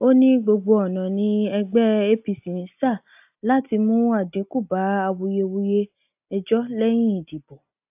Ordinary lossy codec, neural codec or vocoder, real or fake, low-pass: AAC, 32 kbps; none; real; 3.6 kHz